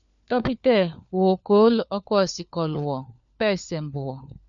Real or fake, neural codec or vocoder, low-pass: fake; codec, 16 kHz, 4 kbps, FunCodec, trained on LibriTTS, 50 frames a second; 7.2 kHz